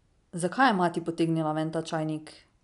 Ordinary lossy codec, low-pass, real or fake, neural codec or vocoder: none; 10.8 kHz; real; none